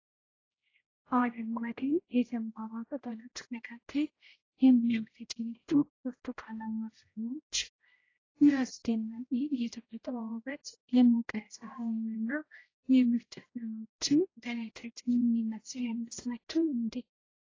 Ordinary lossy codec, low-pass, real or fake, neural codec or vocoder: AAC, 32 kbps; 7.2 kHz; fake; codec, 16 kHz, 0.5 kbps, X-Codec, HuBERT features, trained on general audio